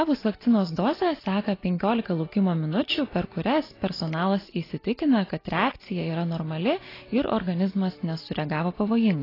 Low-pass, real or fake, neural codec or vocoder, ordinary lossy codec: 5.4 kHz; real; none; AAC, 24 kbps